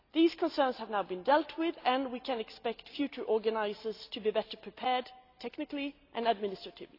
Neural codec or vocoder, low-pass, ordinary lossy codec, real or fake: none; 5.4 kHz; AAC, 32 kbps; real